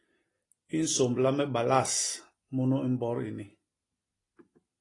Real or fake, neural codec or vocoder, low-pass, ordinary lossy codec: real; none; 10.8 kHz; AAC, 32 kbps